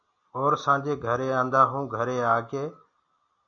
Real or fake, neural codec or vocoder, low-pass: real; none; 7.2 kHz